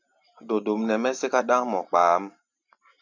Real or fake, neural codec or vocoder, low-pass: fake; vocoder, 44.1 kHz, 128 mel bands every 512 samples, BigVGAN v2; 7.2 kHz